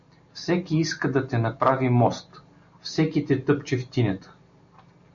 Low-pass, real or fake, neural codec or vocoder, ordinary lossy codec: 7.2 kHz; real; none; MP3, 64 kbps